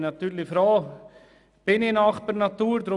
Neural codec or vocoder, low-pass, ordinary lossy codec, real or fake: none; 10.8 kHz; none; real